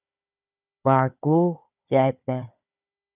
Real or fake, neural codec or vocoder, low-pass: fake; codec, 16 kHz, 4 kbps, FunCodec, trained on Chinese and English, 50 frames a second; 3.6 kHz